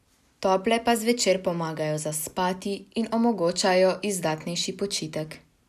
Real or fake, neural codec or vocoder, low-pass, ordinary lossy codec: real; none; 14.4 kHz; none